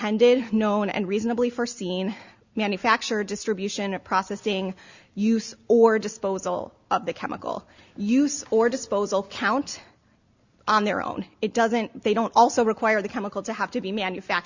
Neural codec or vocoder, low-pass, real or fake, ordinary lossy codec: none; 7.2 kHz; real; Opus, 64 kbps